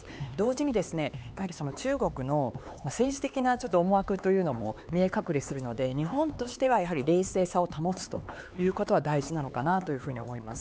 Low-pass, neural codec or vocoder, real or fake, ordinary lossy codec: none; codec, 16 kHz, 4 kbps, X-Codec, HuBERT features, trained on LibriSpeech; fake; none